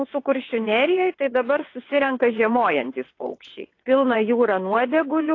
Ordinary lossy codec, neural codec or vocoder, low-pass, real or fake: AAC, 32 kbps; vocoder, 22.05 kHz, 80 mel bands, WaveNeXt; 7.2 kHz; fake